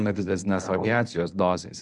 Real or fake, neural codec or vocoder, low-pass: fake; codec, 24 kHz, 0.9 kbps, WavTokenizer, medium speech release version 1; 10.8 kHz